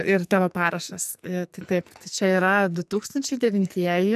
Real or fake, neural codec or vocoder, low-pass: fake; codec, 44.1 kHz, 2.6 kbps, SNAC; 14.4 kHz